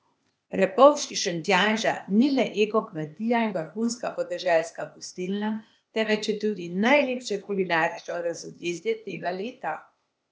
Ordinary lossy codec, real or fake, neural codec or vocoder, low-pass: none; fake; codec, 16 kHz, 0.8 kbps, ZipCodec; none